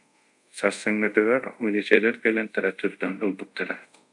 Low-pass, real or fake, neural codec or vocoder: 10.8 kHz; fake; codec, 24 kHz, 0.5 kbps, DualCodec